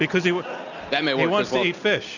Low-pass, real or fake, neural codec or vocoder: 7.2 kHz; real; none